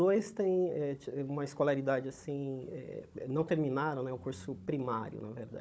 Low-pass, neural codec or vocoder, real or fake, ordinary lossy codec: none; codec, 16 kHz, 16 kbps, FunCodec, trained on Chinese and English, 50 frames a second; fake; none